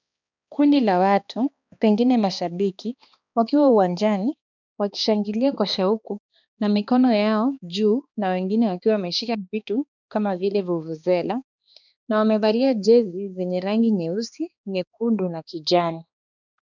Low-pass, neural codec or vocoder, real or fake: 7.2 kHz; codec, 16 kHz, 2 kbps, X-Codec, HuBERT features, trained on balanced general audio; fake